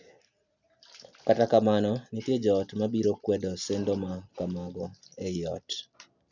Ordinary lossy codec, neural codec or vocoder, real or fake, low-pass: none; none; real; 7.2 kHz